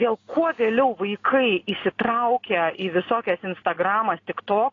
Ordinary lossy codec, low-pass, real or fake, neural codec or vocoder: AAC, 32 kbps; 7.2 kHz; real; none